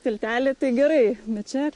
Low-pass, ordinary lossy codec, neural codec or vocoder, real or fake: 10.8 kHz; MP3, 48 kbps; none; real